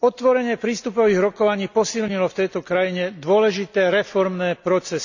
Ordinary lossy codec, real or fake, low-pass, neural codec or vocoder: none; real; 7.2 kHz; none